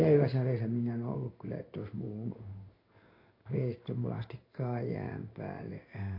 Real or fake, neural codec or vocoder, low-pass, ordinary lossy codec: fake; codec, 16 kHz in and 24 kHz out, 1 kbps, XY-Tokenizer; 5.4 kHz; MP3, 32 kbps